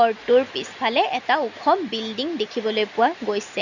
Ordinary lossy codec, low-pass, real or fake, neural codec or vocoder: Opus, 64 kbps; 7.2 kHz; real; none